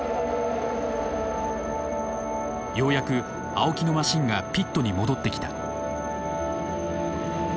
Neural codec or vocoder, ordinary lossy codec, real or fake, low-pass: none; none; real; none